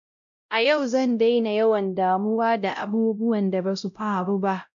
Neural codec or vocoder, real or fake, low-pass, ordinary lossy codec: codec, 16 kHz, 0.5 kbps, X-Codec, WavLM features, trained on Multilingual LibriSpeech; fake; 7.2 kHz; MP3, 64 kbps